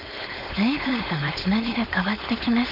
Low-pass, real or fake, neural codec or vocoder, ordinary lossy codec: 5.4 kHz; fake; codec, 16 kHz, 4.8 kbps, FACodec; none